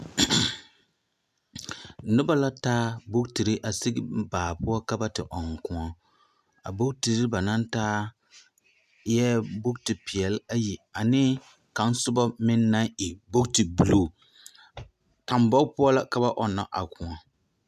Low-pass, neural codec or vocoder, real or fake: 14.4 kHz; none; real